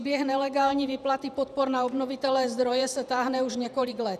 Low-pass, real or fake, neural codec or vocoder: 14.4 kHz; fake; vocoder, 48 kHz, 128 mel bands, Vocos